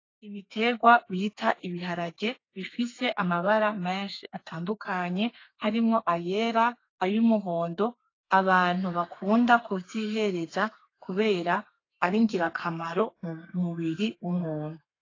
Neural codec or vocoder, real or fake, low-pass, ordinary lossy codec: codec, 32 kHz, 1.9 kbps, SNAC; fake; 7.2 kHz; AAC, 32 kbps